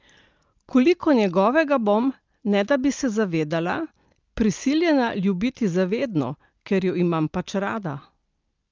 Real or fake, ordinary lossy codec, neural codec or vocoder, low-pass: real; Opus, 24 kbps; none; 7.2 kHz